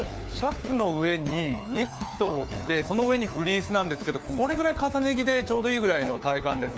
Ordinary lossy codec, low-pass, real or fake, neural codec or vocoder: none; none; fake; codec, 16 kHz, 4 kbps, FunCodec, trained on LibriTTS, 50 frames a second